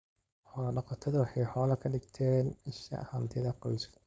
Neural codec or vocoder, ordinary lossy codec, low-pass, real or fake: codec, 16 kHz, 4.8 kbps, FACodec; none; none; fake